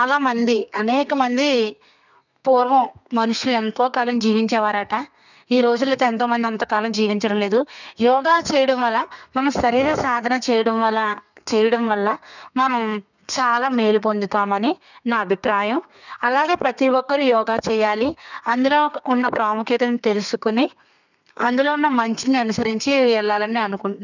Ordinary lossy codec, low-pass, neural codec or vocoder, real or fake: none; 7.2 kHz; codec, 32 kHz, 1.9 kbps, SNAC; fake